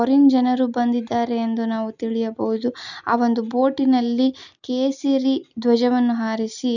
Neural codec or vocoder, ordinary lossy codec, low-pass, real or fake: none; none; 7.2 kHz; real